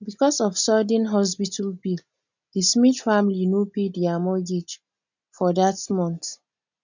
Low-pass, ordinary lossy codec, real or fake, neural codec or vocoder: 7.2 kHz; none; real; none